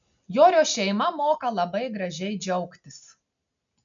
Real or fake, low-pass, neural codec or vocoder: real; 7.2 kHz; none